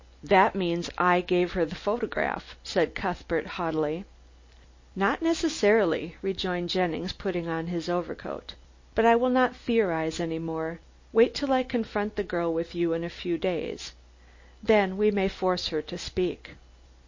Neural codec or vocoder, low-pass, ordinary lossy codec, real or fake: none; 7.2 kHz; MP3, 32 kbps; real